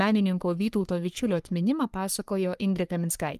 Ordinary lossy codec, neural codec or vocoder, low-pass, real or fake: Opus, 32 kbps; codec, 44.1 kHz, 3.4 kbps, Pupu-Codec; 14.4 kHz; fake